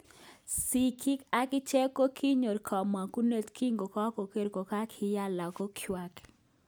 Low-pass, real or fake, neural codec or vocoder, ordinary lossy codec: none; real; none; none